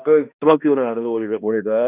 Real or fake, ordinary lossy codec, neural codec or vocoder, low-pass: fake; none; codec, 16 kHz, 1 kbps, X-Codec, HuBERT features, trained on balanced general audio; 3.6 kHz